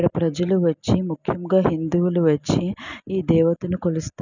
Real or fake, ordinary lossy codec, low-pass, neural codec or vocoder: real; none; 7.2 kHz; none